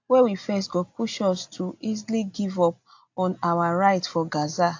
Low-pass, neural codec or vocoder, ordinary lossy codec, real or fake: 7.2 kHz; none; AAC, 48 kbps; real